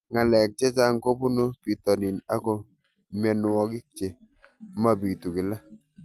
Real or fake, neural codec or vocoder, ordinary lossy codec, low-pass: real; none; Opus, 32 kbps; 14.4 kHz